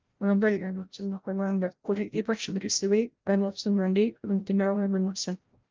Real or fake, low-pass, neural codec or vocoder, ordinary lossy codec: fake; 7.2 kHz; codec, 16 kHz, 0.5 kbps, FreqCodec, larger model; Opus, 32 kbps